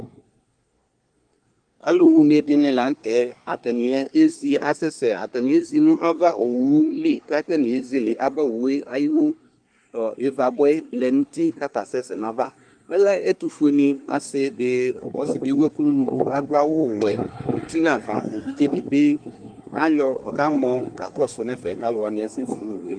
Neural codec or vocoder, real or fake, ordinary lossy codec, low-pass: codec, 24 kHz, 1 kbps, SNAC; fake; Opus, 32 kbps; 9.9 kHz